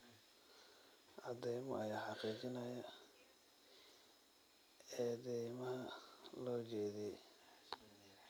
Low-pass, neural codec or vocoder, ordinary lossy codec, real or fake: none; none; none; real